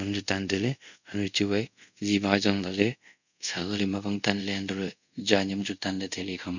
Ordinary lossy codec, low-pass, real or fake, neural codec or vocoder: none; 7.2 kHz; fake; codec, 24 kHz, 0.5 kbps, DualCodec